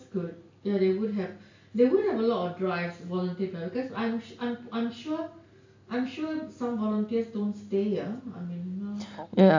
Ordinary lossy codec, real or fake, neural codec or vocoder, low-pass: AAC, 48 kbps; real; none; 7.2 kHz